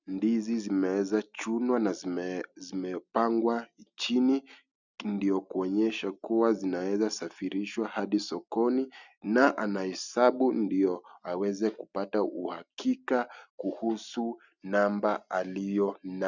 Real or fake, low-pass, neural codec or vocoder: real; 7.2 kHz; none